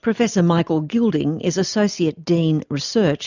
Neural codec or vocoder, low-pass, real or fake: none; 7.2 kHz; real